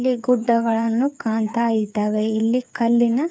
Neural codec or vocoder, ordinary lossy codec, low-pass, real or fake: codec, 16 kHz, 8 kbps, FreqCodec, smaller model; none; none; fake